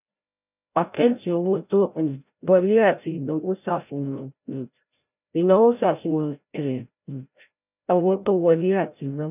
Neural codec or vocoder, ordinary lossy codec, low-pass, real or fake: codec, 16 kHz, 0.5 kbps, FreqCodec, larger model; none; 3.6 kHz; fake